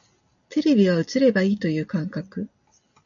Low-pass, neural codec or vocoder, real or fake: 7.2 kHz; none; real